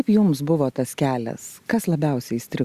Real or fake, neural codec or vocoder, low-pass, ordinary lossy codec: real; none; 14.4 kHz; Opus, 64 kbps